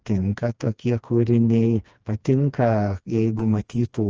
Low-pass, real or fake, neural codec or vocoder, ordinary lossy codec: 7.2 kHz; fake; codec, 16 kHz, 2 kbps, FreqCodec, smaller model; Opus, 24 kbps